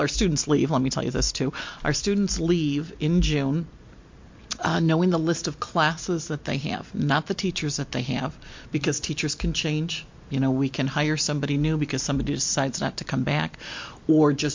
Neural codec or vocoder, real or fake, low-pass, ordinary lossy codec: none; real; 7.2 kHz; MP3, 48 kbps